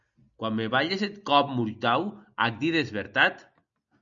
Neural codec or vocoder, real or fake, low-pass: none; real; 7.2 kHz